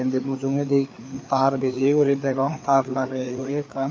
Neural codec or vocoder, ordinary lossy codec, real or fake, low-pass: codec, 16 kHz, 4 kbps, FreqCodec, larger model; none; fake; none